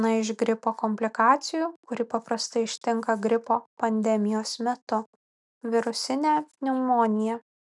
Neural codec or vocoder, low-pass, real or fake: none; 10.8 kHz; real